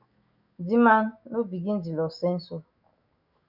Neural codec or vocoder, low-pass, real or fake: autoencoder, 48 kHz, 128 numbers a frame, DAC-VAE, trained on Japanese speech; 5.4 kHz; fake